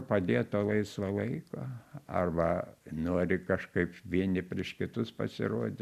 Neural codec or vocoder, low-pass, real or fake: none; 14.4 kHz; real